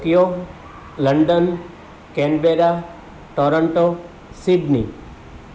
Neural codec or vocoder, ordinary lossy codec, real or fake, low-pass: none; none; real; none